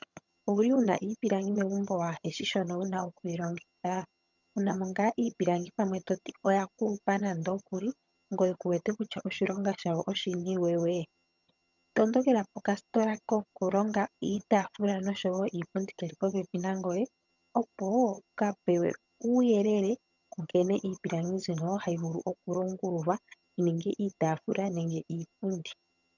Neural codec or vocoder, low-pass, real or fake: vocoder, 22.05 kHz, 80 mel bands, HiFi-GAN; 7.2 kHz; fake